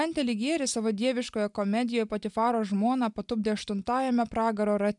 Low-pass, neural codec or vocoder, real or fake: 10.8 kHz; none; real